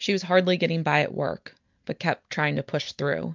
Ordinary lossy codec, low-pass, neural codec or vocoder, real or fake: MP3, 64 kbps; 7.2 kHz; none; real